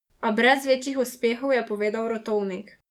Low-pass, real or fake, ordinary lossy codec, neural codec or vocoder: 19.8 kHz; fake; none; codec, 44.1 kHz, 7.8 kbps, DAC